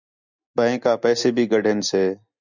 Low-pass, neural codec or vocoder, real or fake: 7.2 kHz; none; real